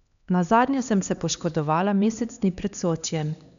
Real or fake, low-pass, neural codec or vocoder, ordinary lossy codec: fake; 7.2 kHz; codec, 16 kHz, 2 kbps, X-Codec, HuBERT features, trained on LibriSpeech; none